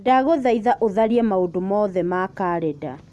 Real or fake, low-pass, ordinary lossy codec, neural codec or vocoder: real; none; none; none